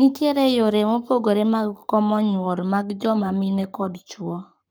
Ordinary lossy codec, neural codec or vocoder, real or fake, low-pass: none; codec, 44.1 kHz, 7.8 kbps, Pupu-Codec; fake; none